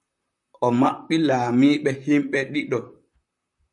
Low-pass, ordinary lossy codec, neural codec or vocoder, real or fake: 10.8 kHz; MP3, 96 kbps; vocoder, 44.1 kHz, 128 mel bands, Pupu-Vocoder; fake